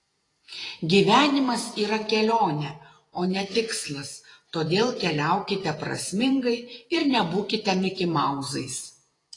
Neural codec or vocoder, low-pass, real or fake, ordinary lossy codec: vocoder, 48 kHz, 128 mel bands, Vocos; 10.8 kHz; fake; AAC, 32 kbps